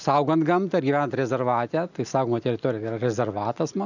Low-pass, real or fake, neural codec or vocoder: 7.2 kHz; real; none